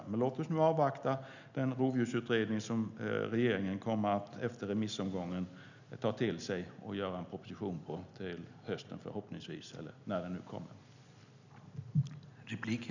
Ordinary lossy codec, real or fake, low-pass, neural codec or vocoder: none; real; 7.2 kHz; none